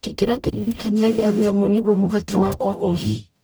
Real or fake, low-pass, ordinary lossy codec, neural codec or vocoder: fake; none; none; codec, 44.1 kHz, 0.9 kbps, DAC